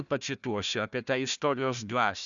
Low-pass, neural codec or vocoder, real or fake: 7.2 kHz; codec, 16 kHz, 1 kbps, FunCodec, trained on Chinese and English, 50 frames a second; fake